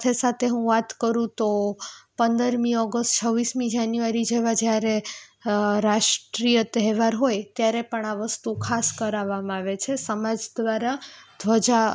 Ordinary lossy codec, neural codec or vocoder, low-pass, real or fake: none; none; none; real